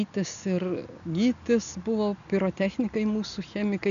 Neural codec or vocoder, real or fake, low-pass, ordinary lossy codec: none; real; 7.2 kHz; MP3, 96 kbps